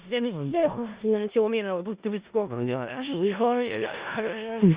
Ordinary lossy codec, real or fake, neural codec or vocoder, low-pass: Opus, 64 kbps; fake; codec, 16 kHz in and 24 kHz out, 0.4 kbps, LongCat-Audio-Codec, four codebook decoder; 3.6 kHz